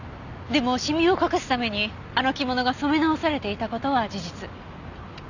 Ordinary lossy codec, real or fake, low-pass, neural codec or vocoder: none; real; 7.2 kHz; none